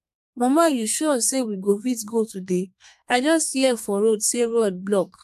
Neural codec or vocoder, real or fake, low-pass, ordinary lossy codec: codec, 32 kHz, 1.9 kbps, SNAC; fake; 14.4 kHz; AAC, 96 kbps